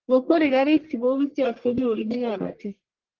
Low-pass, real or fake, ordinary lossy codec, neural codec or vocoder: 7.2 kHz; fake; Opus, 16 kbps; codec, 44.1 kHz, 1.7 kbps, Pupu-Codec